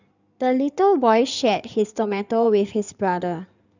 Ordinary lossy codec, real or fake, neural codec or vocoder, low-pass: none; fake; codec, 16 kHz in and 24 kHz out, 2.2 kbps, FireRedTTS-2 codec; 7.2 kHz